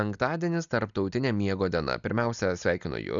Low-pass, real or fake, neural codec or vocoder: 7.2 kHz; real; none